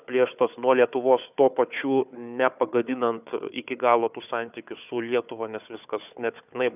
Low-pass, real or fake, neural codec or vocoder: 3.6 kHz; fake; codec, 16 kHz, 4 kbps, FunCodec, trained on Chinese and English, 50 frames a second